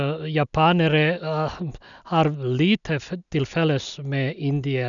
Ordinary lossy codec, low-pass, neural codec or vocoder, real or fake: none; 7.2 kHz; none; real